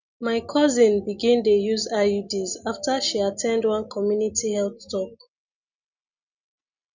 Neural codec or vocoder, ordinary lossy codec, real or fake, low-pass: none; none; real; 7.2 kHz